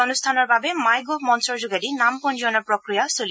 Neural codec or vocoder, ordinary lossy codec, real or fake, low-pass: none; none; real; none